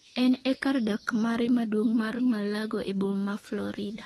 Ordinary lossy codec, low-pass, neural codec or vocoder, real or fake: AAC, 32 kbps; 19.8 kHz; autoencoder, 48 kHz, 32 numbers a frame, DAC-VAE, trained on Japanese speech; fake